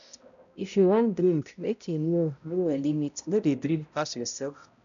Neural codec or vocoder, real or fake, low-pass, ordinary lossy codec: codec, 16 kHz, 0.5 kbps, X-Codec, HuBERT features, trained on balanced general audio; fake; 7.2 kHz; none